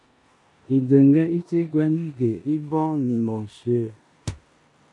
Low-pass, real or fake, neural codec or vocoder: 10.8 kHz; fake; codec, 16 kHz in and 24 kHz out, 0.9 kbps, LongCat-Audio-Codec, four codebook decoder